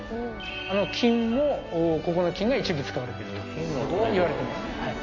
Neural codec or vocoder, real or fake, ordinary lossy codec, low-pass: none; real; MP3, 48 kbps; 7.2 kHz